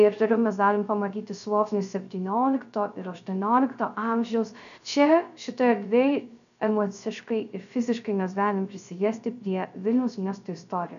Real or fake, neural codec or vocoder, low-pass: fake; codec, 16 kHz, 0.3 kbps, FocalCodec; 7.2 kHz